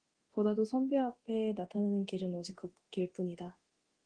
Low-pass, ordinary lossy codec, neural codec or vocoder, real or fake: 9.9 kHz; Opus, 16 kbps; codec, 24 kHz, 0.9 kbps, DualCodec; fake